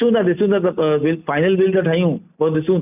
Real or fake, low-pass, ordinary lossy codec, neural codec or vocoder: real; 3.6 kHz; none; none